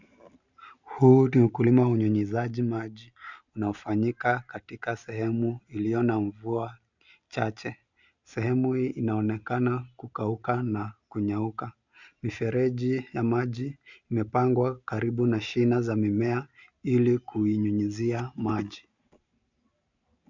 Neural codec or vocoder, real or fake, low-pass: none; real; 7.2 kHz